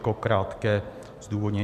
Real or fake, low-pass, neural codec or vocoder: real; 14.4 kHz; none